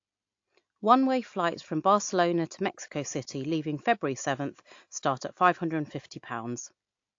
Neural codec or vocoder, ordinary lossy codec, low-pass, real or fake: none; AAC, 48 kbps; 7.2 kHz; real